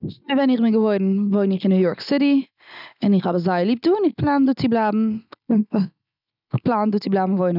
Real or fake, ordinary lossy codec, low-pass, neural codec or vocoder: real; none; 5.4 kHz; none